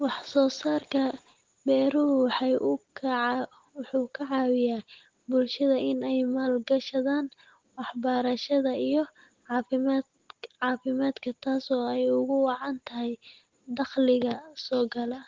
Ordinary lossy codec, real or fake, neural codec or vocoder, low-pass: Opus, 16 kbps; real; none; 7.2 kHz